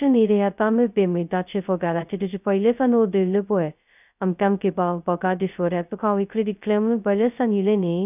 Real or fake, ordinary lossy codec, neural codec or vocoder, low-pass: fake; none; codec, 16 kHz, 0.2 kbps, FocalCodec; 3.6 kHz